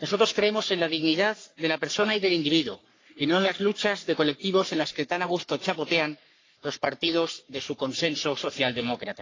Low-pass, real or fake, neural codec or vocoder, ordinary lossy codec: 7.2 kHz; fake; codec, 44.1 kHz, 3.4 kbps, Pupu-Codec; AAC, 32 kbps